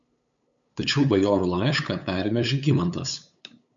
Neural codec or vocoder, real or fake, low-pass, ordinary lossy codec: codec, 16 kHz, 8 kbps, FunCodec, trained on LibriTTS, 25 frames a second; fake; 7.2 kHz; MP3, 64 kbps